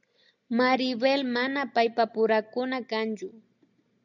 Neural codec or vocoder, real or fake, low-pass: none; real; 7.2 kHz